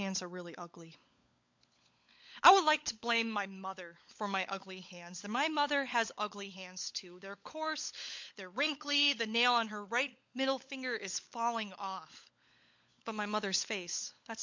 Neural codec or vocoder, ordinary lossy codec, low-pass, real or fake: codec, 16 kHz, 16 kbps, FunCodec, trained on LibriTTS, 50 frames a second; MP3, 48 kbps; 7.2 kHz; fake